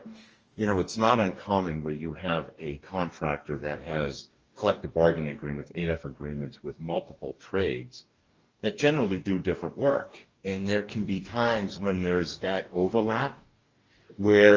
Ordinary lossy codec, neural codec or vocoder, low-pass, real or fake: Opus, 24 kbps; codec, 44.1 kHz, 2.6 kbps, DAC; 7.2 kHz; fake